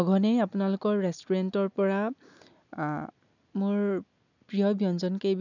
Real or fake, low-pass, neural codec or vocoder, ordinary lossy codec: real; 7.2 kHz; none; none